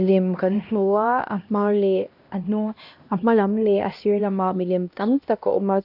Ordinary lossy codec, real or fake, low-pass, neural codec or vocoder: none; fake; 5.4 kHz; codec, 16 kHz, 1 kbps, X-Codec, HuBERT features, trained on LibriSpeech